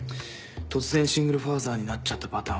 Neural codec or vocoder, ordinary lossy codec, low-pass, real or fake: none; none; none; real